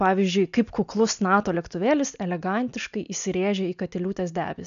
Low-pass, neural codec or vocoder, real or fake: 7.2 kHz; none; real